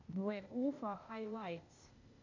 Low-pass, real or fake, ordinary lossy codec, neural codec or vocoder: 7.2 kHz; fake; AAC, 48 kbps; codec, 16 kHz, 0.8 kbps, ZipCodec